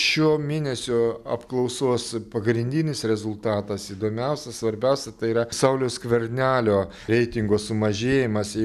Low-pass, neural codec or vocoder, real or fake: 14.4 kHz; none; real